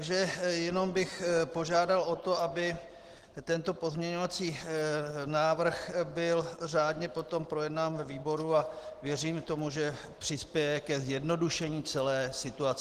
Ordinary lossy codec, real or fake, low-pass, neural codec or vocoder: Opus, 16 kbps; real; 14.4 kHz; none